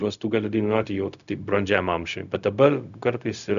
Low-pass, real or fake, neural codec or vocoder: 7.2 kHz; fake; codec, 16 kHz, 0.4 kbps, LongCat-Audio-Codec